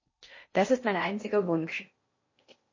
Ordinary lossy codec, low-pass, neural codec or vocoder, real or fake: MP3, 32 kbps; 7.2 kHz; codec, 16 kHz in and 24 kHz out, 0.6 kbps, FocalCodec, streaming, 4096 codes; fake